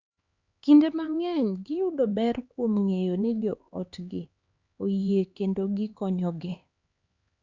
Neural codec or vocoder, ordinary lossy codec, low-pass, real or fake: codec, 16 kHz, 4 kbps, X-Codec, HuBERT features, trained on LibriSpeech; Opus, 64 kbps; 7.2 kHz; fake